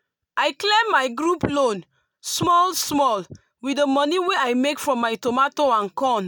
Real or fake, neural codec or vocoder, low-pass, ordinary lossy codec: real; none; none; none